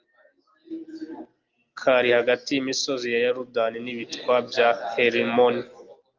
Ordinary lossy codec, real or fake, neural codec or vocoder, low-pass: Opus, 32 kbps; real; none; 7.2 kHz